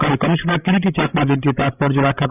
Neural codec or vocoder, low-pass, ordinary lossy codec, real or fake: codec, 16 kHz, 16 kbps, FreqCodec, larger model; 3.6 kHz; none; fake